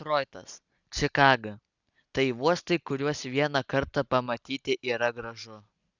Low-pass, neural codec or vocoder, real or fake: 7.2 kHz; none; real